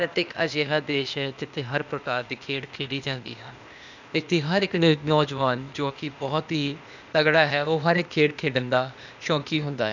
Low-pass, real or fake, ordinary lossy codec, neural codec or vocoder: 7.2 kHz; fake; none; codec, 16 kHz, 0.8 kbps, ZipCodec